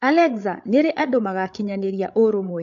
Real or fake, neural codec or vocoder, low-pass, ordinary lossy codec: fake; codec, 16 kHz, 8 kbps, FreqCodec, larger model; 7.2 kHz; AAC, 64 kbps